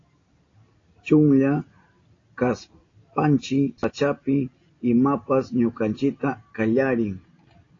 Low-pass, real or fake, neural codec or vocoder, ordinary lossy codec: 7.2 kHz; real; none; AAC, 32 kbps